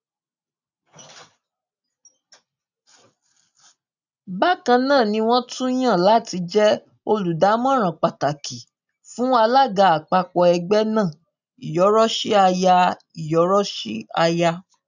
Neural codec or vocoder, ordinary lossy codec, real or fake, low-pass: none; none; real; 7.2 kHz